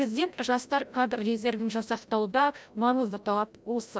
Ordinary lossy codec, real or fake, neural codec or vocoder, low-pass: none; fake; codec, 16 kHz, 0.5 kbps, FreqCodec, larger model; none